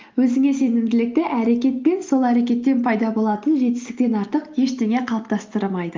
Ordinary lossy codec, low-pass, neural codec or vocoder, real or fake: Opus, 32 kbps; 7.2 kHz; none; real